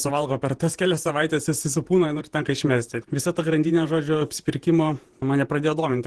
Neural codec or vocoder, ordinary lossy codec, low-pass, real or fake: vocoder, 48 kHz, 128 mel bands, Vocos; Opus, 16 kbps; 10.8 kHz; fake